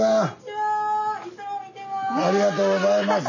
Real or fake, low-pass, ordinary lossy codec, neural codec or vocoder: real; 7.2 kHz; none; none